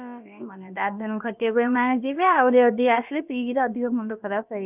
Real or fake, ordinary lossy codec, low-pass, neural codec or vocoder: fake; none; 3.6 kHz; codec, 16 kHz, about 1 kbps, DyCAST, with the encoder's durations